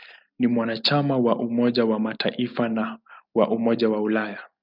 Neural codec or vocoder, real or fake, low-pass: none; real; 5.4 kHz